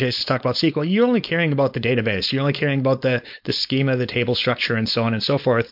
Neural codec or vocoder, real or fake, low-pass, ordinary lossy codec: codec, 16 kHz, 4.8 kbps, FACodec; fake; 5.4 kHz; MP3, 48 kbps